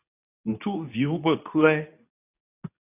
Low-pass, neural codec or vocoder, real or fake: 3.6 kHz; codec, 24 kHz, 0.9 kbps, WavTokenizer, medium speech release version 2; fake